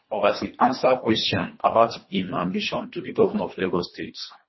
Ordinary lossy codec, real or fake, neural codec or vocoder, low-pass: MP3, 24 kbps; fake; codec, 24 kHz, 1.5 kbps, HILCodec; 7.2 kHz